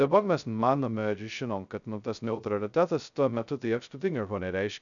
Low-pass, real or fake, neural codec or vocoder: 7.2 kHz; fake; codec, 16 kHz, 0.2 kbps, FocalCodec